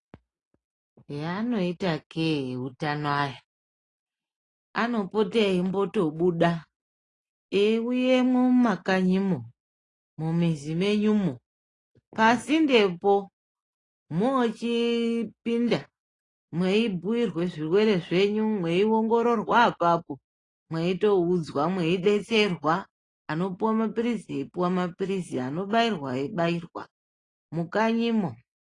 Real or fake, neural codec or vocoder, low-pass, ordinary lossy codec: real; none; 10.8 kHz; AAC, 32 kbps